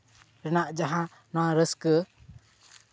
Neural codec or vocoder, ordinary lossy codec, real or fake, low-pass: none; none; real; none